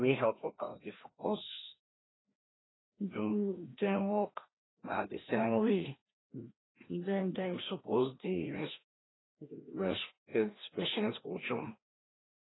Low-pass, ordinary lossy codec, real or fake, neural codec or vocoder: 7.2 kHz; AAC, 16 kbps; fake; codec, 16 kHz, 1 kbps, FreqCodec, larger model